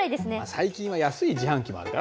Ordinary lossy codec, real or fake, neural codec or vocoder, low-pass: none; real; none; none